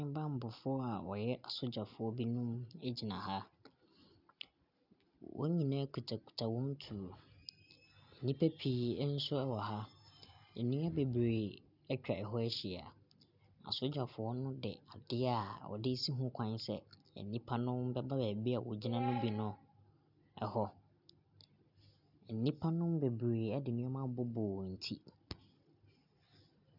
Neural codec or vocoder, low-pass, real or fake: none; 5.4 kHz; real